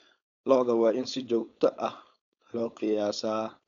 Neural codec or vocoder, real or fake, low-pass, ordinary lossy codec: codec, 16 kHz, 4.8 kbps, FACodec; fake; 7.2 kHz; none